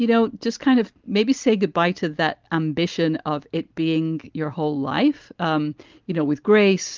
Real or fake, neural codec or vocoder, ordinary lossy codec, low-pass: real; none; Opus, 32 kbps; 7.2 kHz